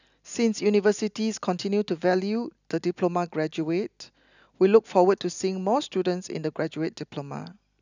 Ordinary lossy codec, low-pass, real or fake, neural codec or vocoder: none; 7.2 kHz; real; none